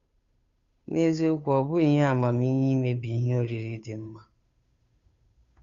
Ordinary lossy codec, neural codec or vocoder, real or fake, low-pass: Opus, 64 kbps; codec, 16 kHz, 2 kbps, FunCodec, trained on Chinese and English, 25 frames a second; fake; 7.2 kHz